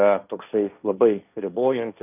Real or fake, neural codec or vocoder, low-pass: fake; codec, 16 kHz, 1.1 kbps, Voila-Tokenizer; 3.6 kHz